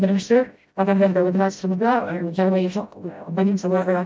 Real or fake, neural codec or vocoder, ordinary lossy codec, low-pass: fake; codec, 16 kHz, 0.5 kbps, FreqCodec, smaller model; none; none